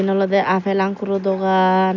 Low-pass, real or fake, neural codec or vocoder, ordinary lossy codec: 7.2 kHz; real; none; none